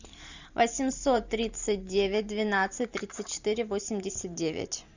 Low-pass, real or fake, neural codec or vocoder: 7.2 kHz; fake; vocoder, 44.1 kHz, 128 mel bands every 512 samples, BigVGAN v2